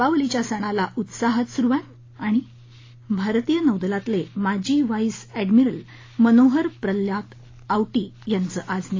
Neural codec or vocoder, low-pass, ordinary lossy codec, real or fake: none; 7.2 kHz; AAC, 32 kbps; real